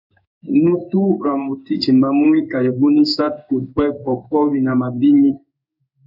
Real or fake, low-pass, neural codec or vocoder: fake; 5.4 kHz; codec, 16 kHz in and 24 kHz out, 1 kbps, XY-Tokenizer